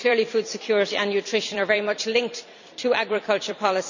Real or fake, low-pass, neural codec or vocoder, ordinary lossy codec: fake; 7.2 kHz; vocoder, 44.1 kHz, 128 mel bands every 256 samples, BigVGAN v2; none